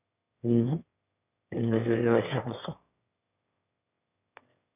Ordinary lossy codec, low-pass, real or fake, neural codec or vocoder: none; 3.6 kHz; fake; autoencoder, 22.05 kHz, a latent of 192 numbers a frame, VITS, trained on one speaker